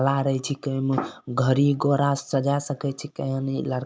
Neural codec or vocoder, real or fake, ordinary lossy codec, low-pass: none; real; none; none